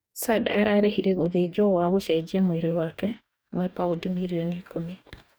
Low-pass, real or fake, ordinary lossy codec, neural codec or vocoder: none; fake; none; codec, 44.1 kHz, 2.6 kbps, DAC